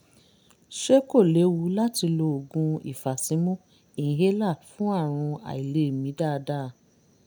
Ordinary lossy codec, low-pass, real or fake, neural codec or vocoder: none; none; real; none